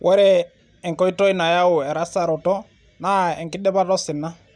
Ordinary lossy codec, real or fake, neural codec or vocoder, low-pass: none; real; none; 9.9 kHz